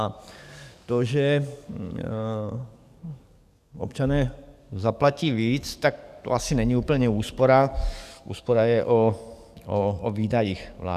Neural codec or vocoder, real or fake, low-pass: codec, 44.1 kHz, 7.8 kbps, DAC; fake; 14.4 kHz